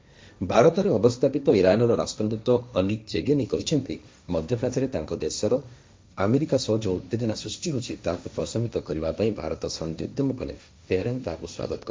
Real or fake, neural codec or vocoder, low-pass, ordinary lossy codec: fake; codec, 16 kHz, 1.1 kbps, Voila-Tokenizer; none; none